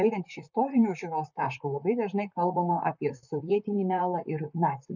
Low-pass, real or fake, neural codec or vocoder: 7.2 kHz; fake; vocoder, 44.1 kHz, 128 mel bands, Pupu-Vocoder